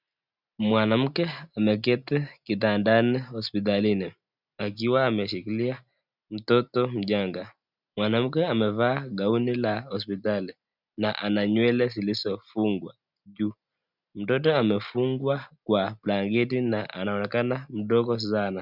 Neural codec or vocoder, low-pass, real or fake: none; 5.4 kHz; real